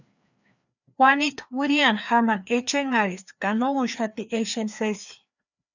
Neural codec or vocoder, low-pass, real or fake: codec, 16 kHz, 2 kbps, FreqCodec, larger model; 7.2 kHz; fake